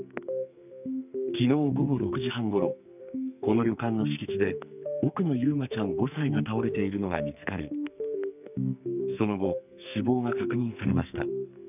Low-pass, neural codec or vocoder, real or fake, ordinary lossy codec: 3.6 kHz; codec, 44.1 kHz, 2.6 kbps, SNAC; fake; none